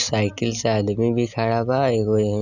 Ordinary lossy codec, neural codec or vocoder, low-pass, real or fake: none; none; 7.2 kHz; real